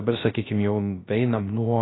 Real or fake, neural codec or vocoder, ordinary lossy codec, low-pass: fake; codec, 16 kHz, 0.3 kbps, FocalCodec; AAC, 16 kbps; 7.2 kHz